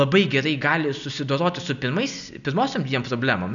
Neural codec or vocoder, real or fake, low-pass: none; real; 7.2 kHz